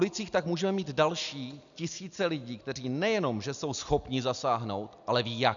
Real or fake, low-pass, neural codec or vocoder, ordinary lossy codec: real; 7.2 kHz; none; MP3, 96 kbps